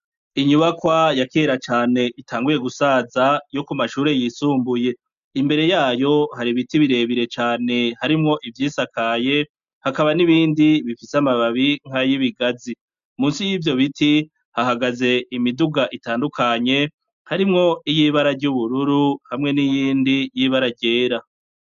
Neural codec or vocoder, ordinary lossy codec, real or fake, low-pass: none; MP3, 64 kbps; real; 7.2 kHz